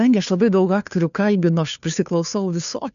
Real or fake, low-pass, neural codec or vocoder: fake; 7.2 kHz; codec, 16 kHz, 2 kbps, FunCodec, trained on Chinese and English, 25 frames a second